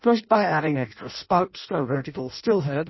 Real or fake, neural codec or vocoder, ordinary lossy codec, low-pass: fake; codec, 16 kHz in and 24 kHz out, 0.6 kbps, FireRedTTS-2 codec; MP3, 24 kbps; 7.2 kHz